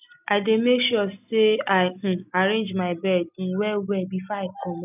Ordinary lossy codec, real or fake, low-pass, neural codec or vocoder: none; real; 3.6 kHz; none